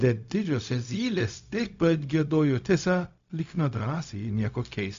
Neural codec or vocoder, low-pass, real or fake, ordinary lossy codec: codec, 16 kHz, 0.4 kbps, LongCat-Audio-Codec; 7.2 kHz; fake; MP3, 64 kbps